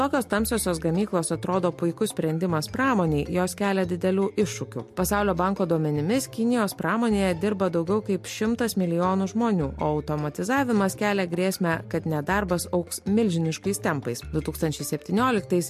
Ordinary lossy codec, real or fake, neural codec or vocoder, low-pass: MP3, 64 kbps; real; none; 14.4 kHz